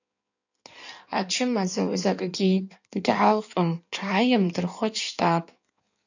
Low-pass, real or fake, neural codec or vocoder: 7.2 kHz; fake; codec, 16 kHz in and 24 kHz out, 1.1 kbps, FireRedTTS-2 codec